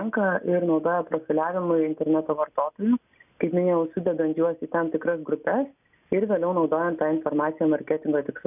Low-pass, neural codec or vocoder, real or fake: 3.6 kHz; none; real